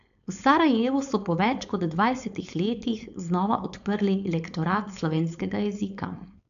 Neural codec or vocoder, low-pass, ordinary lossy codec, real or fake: codec, 16 kHz, 4.8 kbps, FACodec; 7.2 kHz; none; fake